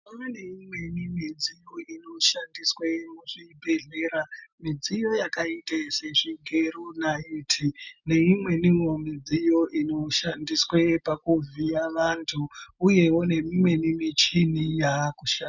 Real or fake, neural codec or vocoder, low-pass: real; none; 7.2 kHz